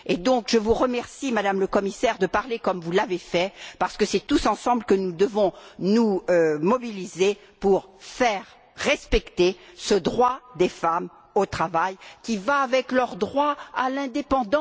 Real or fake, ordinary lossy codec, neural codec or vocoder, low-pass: real; none; none; none